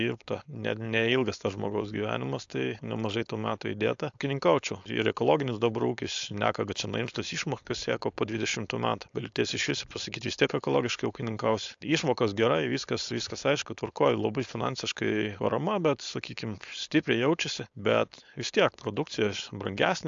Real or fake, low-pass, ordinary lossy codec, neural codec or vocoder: fake; 7.2 kHz; AAC, 64 kbps; codec, 16 kHz, 4.8 kbps, FACodec